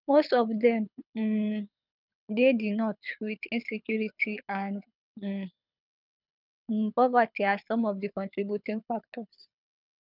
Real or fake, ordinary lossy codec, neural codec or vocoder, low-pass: fake; none; codec, 24 kHz, 6 kbps, HILCodec; 5.4 kHz